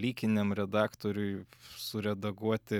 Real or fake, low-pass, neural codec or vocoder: real; 19.8 kHz; none